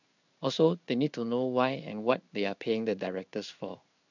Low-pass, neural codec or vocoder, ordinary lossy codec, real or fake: 7.2 kHz; codec, 16 kHz in and 24 kHz out, 1 kbps, XY-Tokenizer; none; fake